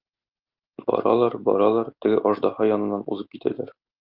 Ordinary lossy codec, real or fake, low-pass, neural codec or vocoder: Opus, 32 kbps; real; 5.4 kHz; none